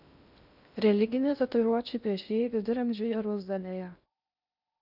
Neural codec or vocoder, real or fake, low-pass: codec, 16 kHz in and 24 kHz out, 0.6 kbps, FocalCodec, streaming, 2048 codes; fake; 5.4 kHz